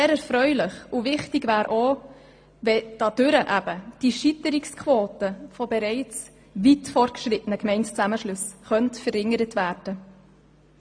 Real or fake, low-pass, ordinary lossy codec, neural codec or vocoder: real; 9.9 kHz; AAC, 64 kbps; none